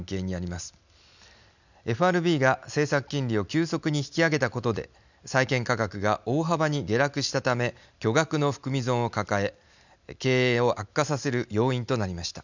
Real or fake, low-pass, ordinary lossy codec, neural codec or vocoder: real; 7.2 kHz; none; none